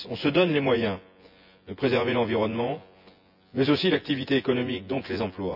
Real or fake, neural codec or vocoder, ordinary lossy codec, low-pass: fake; vocoder, 24 kHz, 100 mel bands, Vocos; none; 5.4 kHz